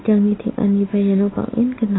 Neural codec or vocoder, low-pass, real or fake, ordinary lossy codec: none; 7.2 kHz; real; AAC, 16 kbps